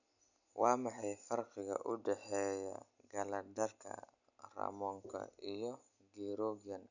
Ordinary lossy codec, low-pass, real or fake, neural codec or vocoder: none; 7.2 kHz; real; none